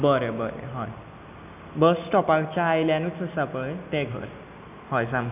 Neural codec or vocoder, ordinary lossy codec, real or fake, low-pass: none; none; real; 3.6 kHz